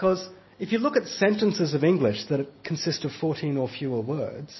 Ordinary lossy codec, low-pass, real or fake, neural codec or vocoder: MP3, 24 kbps; 7.2 kHz; real; none